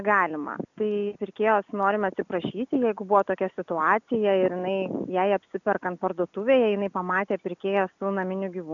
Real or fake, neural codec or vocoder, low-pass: real; none; 7.2 kHz